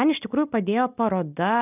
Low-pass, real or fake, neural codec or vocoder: 3.6 kHz; real; none